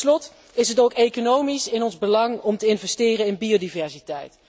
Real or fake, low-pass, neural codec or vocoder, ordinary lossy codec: real; none; none; none